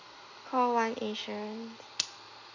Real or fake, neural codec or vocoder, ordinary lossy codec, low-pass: real; none; none; 7.2 kHz